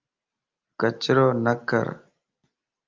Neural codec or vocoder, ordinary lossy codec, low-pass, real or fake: none; Opus, 24 kbps; 7.2 kHz; real